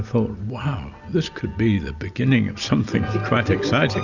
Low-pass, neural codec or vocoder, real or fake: 7.2 kHz; none; real